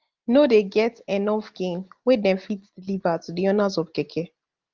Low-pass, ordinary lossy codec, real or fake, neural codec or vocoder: 7.2 kHz; Opus, 32 kbps; real; none